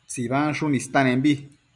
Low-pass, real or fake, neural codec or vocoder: 10.8 kHz; real; none